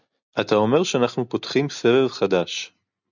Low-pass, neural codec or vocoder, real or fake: 7.2 kHz; none; real